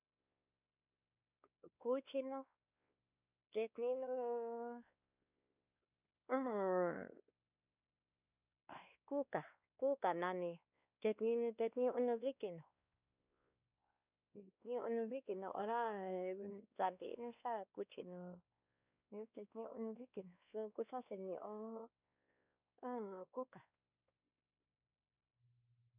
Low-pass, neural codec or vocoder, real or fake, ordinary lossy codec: 3.6 kHz; codec, 16 kHz, 2 kbps, X-Codec, WavLM features, trained on Multilingual LibriSpeech; fake; none